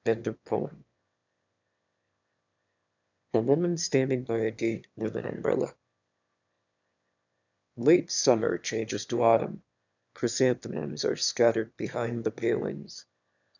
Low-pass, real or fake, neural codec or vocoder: 7.2 kHz; fake; autoencoder, 22.05 kHz, a latent of 192 numbers a frame, VITS, trained on one speaker